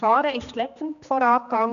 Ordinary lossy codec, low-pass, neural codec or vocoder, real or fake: none; 7.2 kHz; codec, 16 kHz, 2 kbps, X-Codec, HuBERT features, trained on general audio; fake